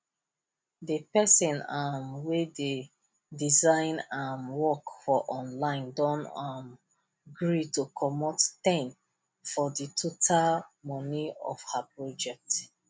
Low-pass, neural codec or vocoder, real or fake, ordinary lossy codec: none; none; real; none